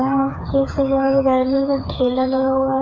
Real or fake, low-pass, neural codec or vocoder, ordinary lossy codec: fake; 7.2 kHz; codec, 16 kHz, 4 kbps, FreqCodec, smaller model; none